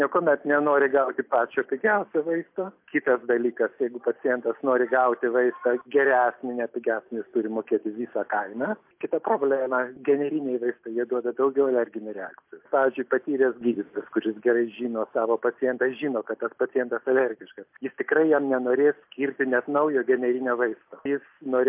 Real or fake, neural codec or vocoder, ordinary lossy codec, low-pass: real; none; MP3, 32 kbps; 3.6 kHz